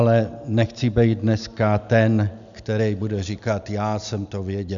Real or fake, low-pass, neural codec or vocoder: real; 7.2 kHz; none